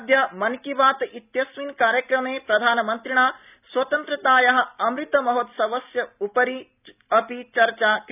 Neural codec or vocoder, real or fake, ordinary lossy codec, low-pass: none; real; none; 3.6 kHz